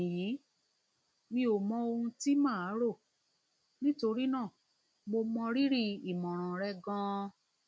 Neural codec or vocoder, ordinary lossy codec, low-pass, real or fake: none; none; none; real